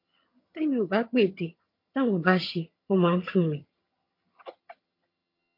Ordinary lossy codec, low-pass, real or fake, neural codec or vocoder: MP3, 32 kbps; 5.4 kHz; fake; vocoder, 22.05 kHz, 80 mel bands, HiFi-GAN